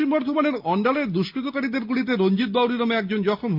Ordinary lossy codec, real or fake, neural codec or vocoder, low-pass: Opus, 24 kbps; real; none; 5.4 kHz